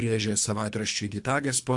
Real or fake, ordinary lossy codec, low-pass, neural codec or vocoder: fake; AAC, 64 kbps; 10.8 kHz; codec, 24 kHz, 3 kbps, HILCodec